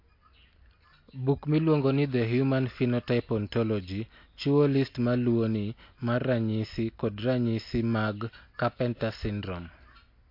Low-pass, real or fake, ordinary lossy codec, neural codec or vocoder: 5.4 kHz; real; MP3, 32 kbps; none